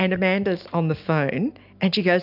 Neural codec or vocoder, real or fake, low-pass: none; real; 5.4 kHz